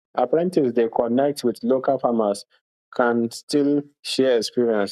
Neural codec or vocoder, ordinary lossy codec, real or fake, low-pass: codec, 44.1 kHz, 7.8 kbps, Pupu-Codec; none; fake; 14.4 kHz